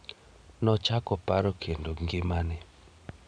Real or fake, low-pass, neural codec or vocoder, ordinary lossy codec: real; 9.9 kHz; none; none